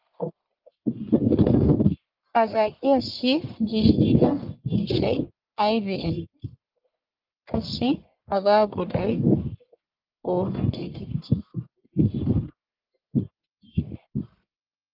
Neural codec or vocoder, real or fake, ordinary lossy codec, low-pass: codec, 44.1 kHz, 1.7 kbps, Pupu-Codec; fake; Opus, 24 kbps; 5.4 kHz